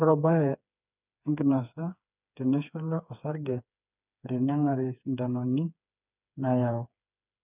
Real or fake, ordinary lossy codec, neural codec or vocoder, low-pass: fake; none; codec, 16 kHz, 4 kbps, FreqCodec, smaller model; 3.6 kHz